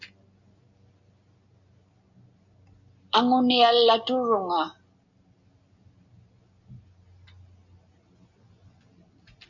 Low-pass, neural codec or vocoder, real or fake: 7.2 kHz; none; real